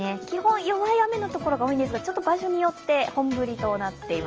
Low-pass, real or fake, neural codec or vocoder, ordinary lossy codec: 7.2 kHz; real; none; Opus, 24 kbps